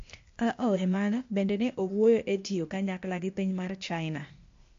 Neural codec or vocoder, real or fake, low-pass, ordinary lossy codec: codec, 16 kHz, 0.8 kbps, ZipCodec; fake; 7.2 kHz; MP3, 48 kbps